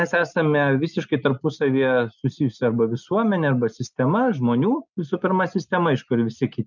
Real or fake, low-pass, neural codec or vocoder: real; 7.2 kHz; none